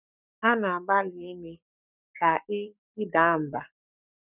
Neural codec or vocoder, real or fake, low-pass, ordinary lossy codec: codec, 44.1 kHz, 7.8 kbps, Pupu-Codec; fake; 3.6 kHz; none